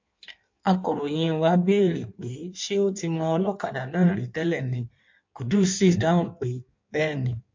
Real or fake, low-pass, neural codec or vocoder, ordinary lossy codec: fake; 7.2 kHz; codec, 16 kHz in and 24 kHz out, 1.1 kbps, FireRedTTS-2 codec; MP3, 48 kbps